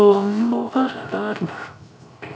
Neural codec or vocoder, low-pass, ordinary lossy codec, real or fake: codec, 16 kHz, 0.3 kbps, FocalCodec; none; none; fake